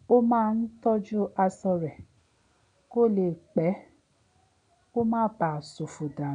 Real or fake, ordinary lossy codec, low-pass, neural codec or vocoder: real; none; 9.9 kHz; none